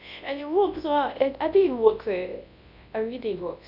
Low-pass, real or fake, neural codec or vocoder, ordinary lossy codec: 5.4 kHz; fake; codec, 24 kHz, 0.9 kbps, WavTokenizer, large speech release; none